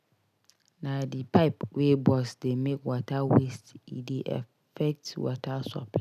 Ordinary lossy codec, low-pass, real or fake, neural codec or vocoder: none; 14.4 kHz; real; none